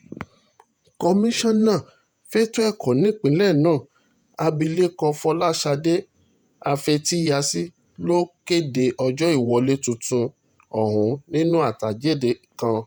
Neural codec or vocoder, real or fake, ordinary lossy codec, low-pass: vocoder, 48 kHz, 128 mel bands, Vocos; fake; none; none